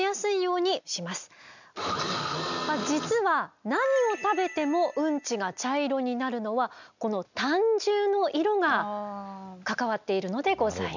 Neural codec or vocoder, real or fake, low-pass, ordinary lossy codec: none; real; 7.2 kHz; none